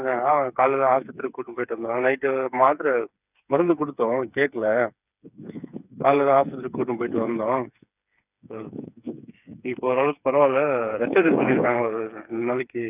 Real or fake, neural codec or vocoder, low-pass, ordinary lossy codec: fake; codec, 16 kHz, 4 kbps, FreqCodec, smaller model; 3.6 kHz; none